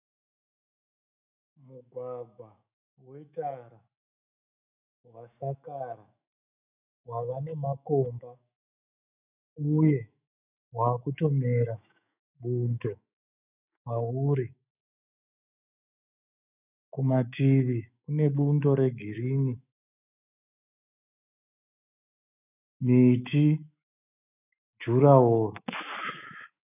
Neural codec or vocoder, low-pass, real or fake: autoencoder, 48 kHz, 128 numbers a frame, DAC-VAE, trained on Japanese speech; 3.6 kHz; fake